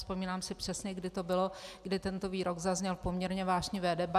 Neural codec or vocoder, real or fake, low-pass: none; real; 14.4 kHz